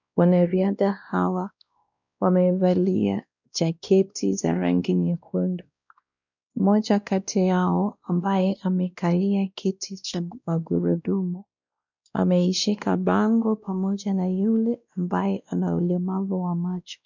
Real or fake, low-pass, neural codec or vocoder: fake; 7.2 kHz; codec, 16 kHz, 1 kbps, X-Codec, WavLM features, trained on Multilingual LibriSpeech